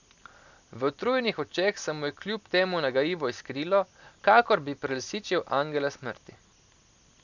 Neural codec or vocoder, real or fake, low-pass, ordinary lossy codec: none; real; 7.2 kHz; none